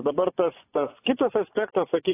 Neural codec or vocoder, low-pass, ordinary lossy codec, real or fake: vocoder, 24 kHz, 100 mel bands, Vocos; 3.6 kHz; AAC, 16 kbps; fake